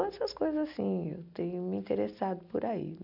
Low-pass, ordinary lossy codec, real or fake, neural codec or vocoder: 5.4 kHz; none; real; none